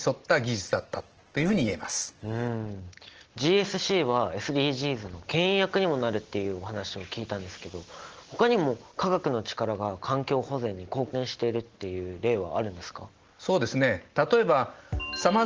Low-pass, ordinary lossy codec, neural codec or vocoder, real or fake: 7.2 kHz; Opus, 16 kbps; none; real